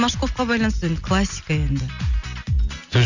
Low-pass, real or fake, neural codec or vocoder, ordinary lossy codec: 7.2 kHz; real; none; none